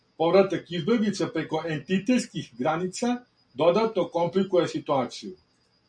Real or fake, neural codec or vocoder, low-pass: real; none; 9.9 kHz